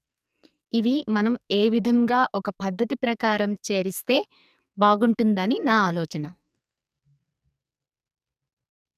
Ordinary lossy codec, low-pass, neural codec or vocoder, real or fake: none; 14.4 kHz; codec, 44.1 kHz, 2.6 kbps, SNAC; fake